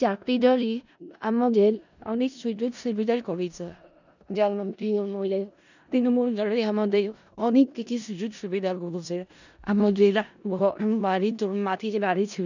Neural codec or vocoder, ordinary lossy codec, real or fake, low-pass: codec, 16 kHz in and 24 kHz out, 0.4 kbps, LongCat-Audio-Codec, four codebook decoder; none; fake; 7.2 kHz